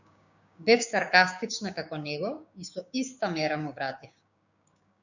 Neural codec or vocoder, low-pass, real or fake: codec, 16 kHz, 6 kbps, DAC; 7.2 kHz; fake